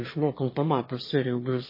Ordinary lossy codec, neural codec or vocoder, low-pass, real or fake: MP3, 24 kbps; autoencoder, 22.05 kHz, a latent of 192 numbers a frame, VITS, trained on one speaker; 5.4 kHz; fake